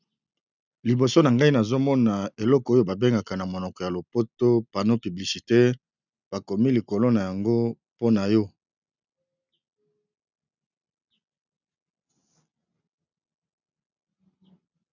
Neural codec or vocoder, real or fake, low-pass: none; real; 7.2 kHz